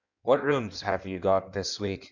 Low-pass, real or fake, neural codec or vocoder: 7.2 kHz; fake; codec, 16 kHz in and 24 kHz out, 1.1 kbps, FireRedTTS-2 codec